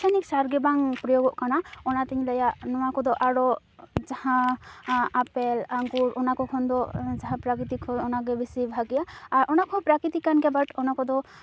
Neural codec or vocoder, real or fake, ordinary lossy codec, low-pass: none; real; none; none